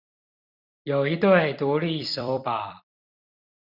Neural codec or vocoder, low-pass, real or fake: vocoder, 44.1 kHz, 128 mel bands every 256 samples, BigVGAN v2; 5.4 kHz; fake